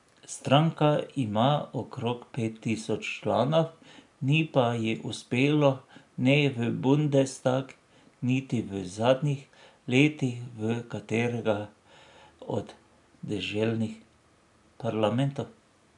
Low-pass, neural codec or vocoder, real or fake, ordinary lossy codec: 10.8 kHz; none; real; none